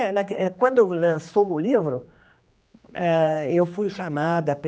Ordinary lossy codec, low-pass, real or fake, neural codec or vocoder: none; none; fake; codec, 16 kHz, 2 kbps, X-Codec, HuBERT features, trained on general audio